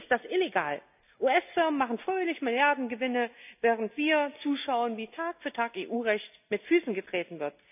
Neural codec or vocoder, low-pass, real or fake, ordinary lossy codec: none; 3.6 kHz; real; none